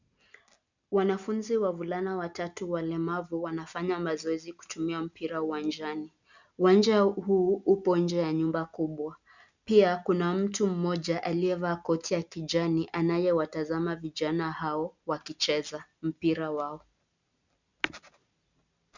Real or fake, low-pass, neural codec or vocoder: real; 7.2 kHz; none